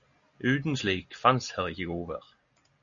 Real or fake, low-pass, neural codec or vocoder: real; 7.2 kHz; none